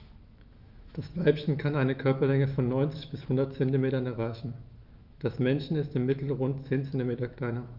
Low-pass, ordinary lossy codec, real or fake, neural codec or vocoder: 5.4 kHz; Opus, 32 kbps; fake; autoencoder, 48 kHz, 128 numbers a frame, DAC-VAE, trained on Japanese speech